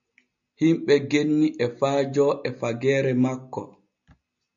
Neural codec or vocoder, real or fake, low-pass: none; real; 7.2 kHz